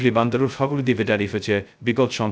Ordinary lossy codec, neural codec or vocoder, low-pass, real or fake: none; codec, 16 kHz, 0.2 kbps, FocalCodec; none; fake